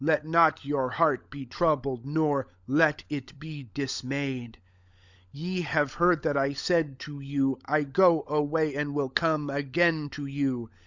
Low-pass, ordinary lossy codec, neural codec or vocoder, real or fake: 7.2 kHz; Opus, 64 kbps; codec, 16 kHz, 16 kbps, FunCodec, trained on LibriTTS, 50 frames a second; fake